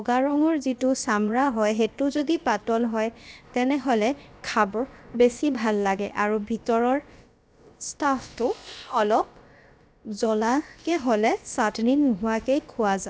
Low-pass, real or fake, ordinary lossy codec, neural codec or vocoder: none; fake; none; codec, 16 kHz, about 1 kbps, DyCAST, with the encoder's durations